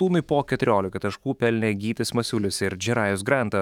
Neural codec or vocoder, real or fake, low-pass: autoencoder, 48 kHz, 128 numbers a frame, DAC-VAE, trained on Japanese speech; fake; 19.8 kHz